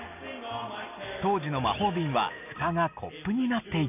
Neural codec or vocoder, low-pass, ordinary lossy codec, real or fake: none; 3.6 kHz; none; real